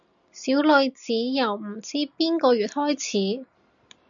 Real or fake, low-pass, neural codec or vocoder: real; 7.2 kHz; none